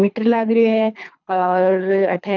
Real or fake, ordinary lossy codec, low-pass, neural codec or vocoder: fake; none; 7.2 kHz; codec, 24 kHz, 3 kbps, HILCodec